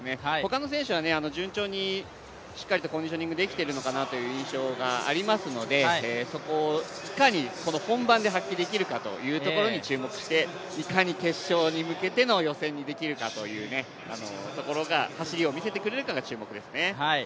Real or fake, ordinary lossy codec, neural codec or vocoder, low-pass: real; none; none; none